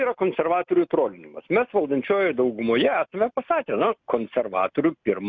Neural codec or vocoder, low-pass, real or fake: none; 7.2 kHz; real